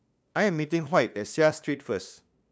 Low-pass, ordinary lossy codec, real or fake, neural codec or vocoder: none; none; fake; codec, 16 kHz, 2 kbps, FunCodec, trained on LibriTTS, 25 frames a second